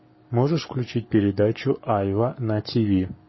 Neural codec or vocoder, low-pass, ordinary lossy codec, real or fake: codec, 44.1 kHz, 7.8 kbps, Pupu-Codec; 7.2 kHz; MP3, 24 kbps; fake